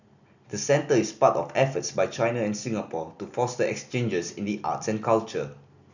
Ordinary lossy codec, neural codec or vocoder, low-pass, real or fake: none; none; 7.2 kHz; real